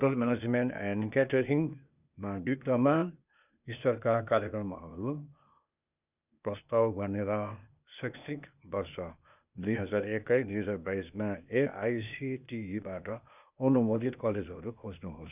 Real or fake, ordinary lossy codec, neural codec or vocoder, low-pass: fake; none; codec, 16 kHz, 0.8 kbps, ZipCodec; 3.6 kHz